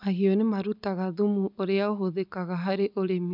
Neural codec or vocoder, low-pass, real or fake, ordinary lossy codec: none; 5.4 kHz; real; none